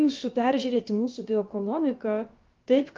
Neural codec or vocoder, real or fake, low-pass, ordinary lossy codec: codec, 16 kHz, about 1 kbps, DyCAST, with the encoder's durations; fake; 7.2 kHz; Opus, 32 kbps